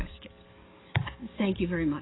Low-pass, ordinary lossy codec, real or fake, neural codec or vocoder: 7.2 kHz; AAC, 16 kbps; fake; codec, 44.1 kHz, 7.8 kbps, DAC